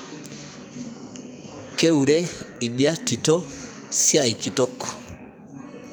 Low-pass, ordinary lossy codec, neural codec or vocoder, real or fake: none; none; codec, 44.1 kHz, 2.6 kbps, SNAC; fake